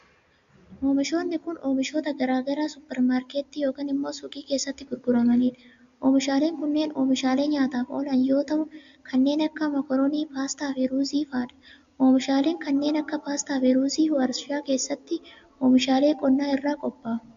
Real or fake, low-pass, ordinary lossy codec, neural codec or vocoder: real; 7.2 kHz; AAC, 64 kbps; none